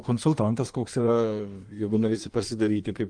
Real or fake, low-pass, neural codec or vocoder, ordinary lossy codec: fake; 9.9 kHz; codec, 16 kHz in and 24 kHz out, 1.1 kbps, FireRedTTS-2 codec; Opus, 32 kbps